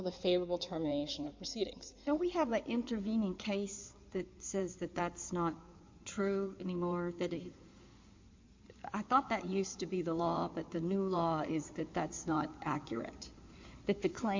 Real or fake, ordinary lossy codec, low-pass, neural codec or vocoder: fake; MP3, 48 kbps; 7.2 kHz; codec, 16 kHz in and 24 kHz out, 2.2 kbps, FireRedTTS-2 codec